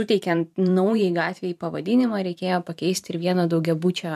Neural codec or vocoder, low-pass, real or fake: vocoder, 44.1 kHz, 128 mel bands every 512 samples, BigVGAN v2; 14.4 kHz; fake